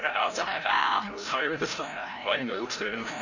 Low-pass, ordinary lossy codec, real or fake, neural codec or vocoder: 7.2 kHz; none; fake; codec, 16 kHz, 0.5 kbps, FreqCodec, larger model